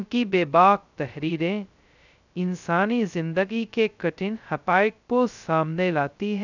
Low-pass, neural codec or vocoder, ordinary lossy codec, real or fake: 7.2 kHz; codec, 16 kHz, 0.2 kbps, FocalCodec; none; fake